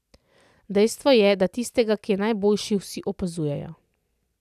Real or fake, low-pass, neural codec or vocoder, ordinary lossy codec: real; 14.4 kHz; none; none